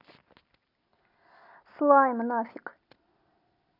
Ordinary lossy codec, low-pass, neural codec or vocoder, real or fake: none; 5.4 kHz; none; real